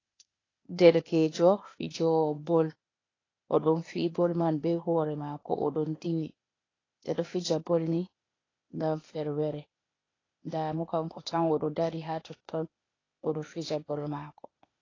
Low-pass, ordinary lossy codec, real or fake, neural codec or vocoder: 7.2 kHz; AAC, 32 kbps; fake; codec, 16 kHz, 0.8 kbps, ZipCodec